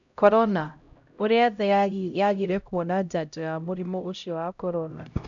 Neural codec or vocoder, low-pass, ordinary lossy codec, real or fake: codec, 16 kHz, 0.5 kbps, X-Codec, HuBERT features, trained on LibriSpeech; 7.2 kHz; none; fake